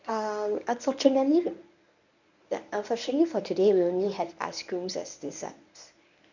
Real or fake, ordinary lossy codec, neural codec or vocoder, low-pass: fake; none; codec, 24 kHz, 0.9 kbps, WavTokenizer, medium speech release version 1; 7.2 kHz